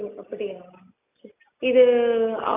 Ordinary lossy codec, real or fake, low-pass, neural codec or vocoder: AAC, 32 kbps; real; 3.6 kHz; none